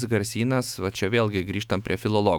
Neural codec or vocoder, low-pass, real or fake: autoencoder, 48 kHz, 128 numbers a frame, DAC-VAE, trained on Japanese speech; 19.8 kHz; fake